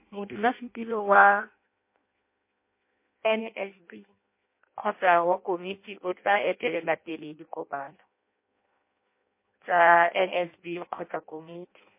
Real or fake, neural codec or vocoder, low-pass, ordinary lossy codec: fake; codec, 16 kHz in and 24 kHz out, 0.6 kbps, FireRedTTS-2 codec; 3.6 kHz; MP3, 24 kbps